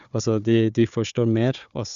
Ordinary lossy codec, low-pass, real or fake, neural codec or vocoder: none; 7.2 kHz; fake; codec, 16 kHz, 4 kbps, FunCodec, trained on Chinese and English, 50 frames a second